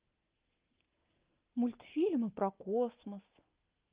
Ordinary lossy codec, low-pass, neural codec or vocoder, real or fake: Opus, 32 kbps; 3.6 kHz; none; real